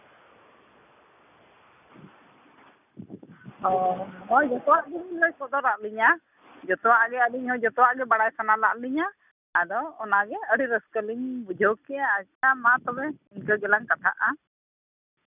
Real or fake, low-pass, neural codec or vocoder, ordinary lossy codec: real; 3.6 kHz; none; none